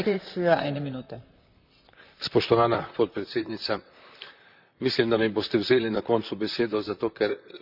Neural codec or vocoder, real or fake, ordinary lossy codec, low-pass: vocoder, 44.1 kHz, 128 mel bands, Pupu-Vocoder; fake; none; 5.4 kHz